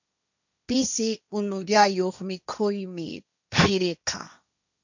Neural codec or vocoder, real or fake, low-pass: codec, 16 kHz, 1.1 kbps, Voila-Tokenizer; fake; 7.2 kHz